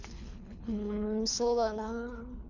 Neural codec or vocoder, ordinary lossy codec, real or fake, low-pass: codec, 24 kHz, 3 kbps, HILCodec; none; fake; 7.2 kHz